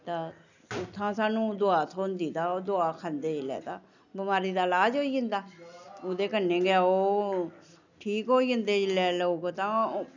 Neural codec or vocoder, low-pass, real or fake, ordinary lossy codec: none; 7.2 kHz; real; none